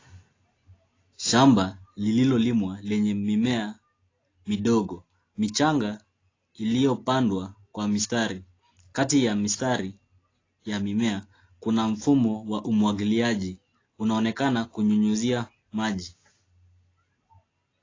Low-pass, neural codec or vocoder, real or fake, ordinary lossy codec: 7.2 kHz; none; real; AAC, 32 kbps